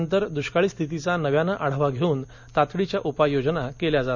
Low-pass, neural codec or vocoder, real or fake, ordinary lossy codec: 7.2 kHz; none; real; none